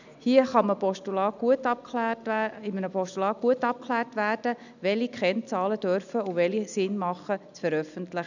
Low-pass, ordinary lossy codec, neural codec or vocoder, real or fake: 7.2 kHz; none; none; real